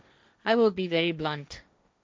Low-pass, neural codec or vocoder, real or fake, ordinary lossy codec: none; codec, 16 kHz, 1.1 kbps, Voila-Tokenizer; fake; none